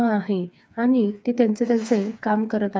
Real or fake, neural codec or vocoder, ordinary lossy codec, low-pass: fake; codec, 16 kHz, 4 kbps, FreqCodec, smaller model; none; none